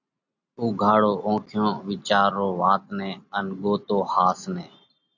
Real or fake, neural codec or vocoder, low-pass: real; none; 7.2 kHz